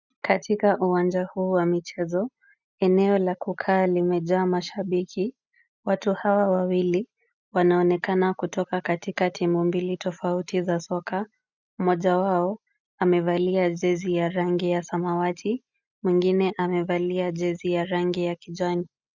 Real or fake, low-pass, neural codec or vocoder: real; 7.2 kHz; none